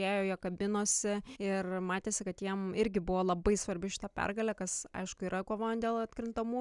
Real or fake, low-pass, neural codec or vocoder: real; 10.8 kHz; none